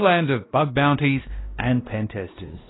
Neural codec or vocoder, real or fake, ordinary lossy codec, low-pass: codec, 16 kHz, 1 kbps, X-Codec, WavLM features, trained on Multilingual LibriSpeech; fake; AAC, 16 kbps; 7.2 kHz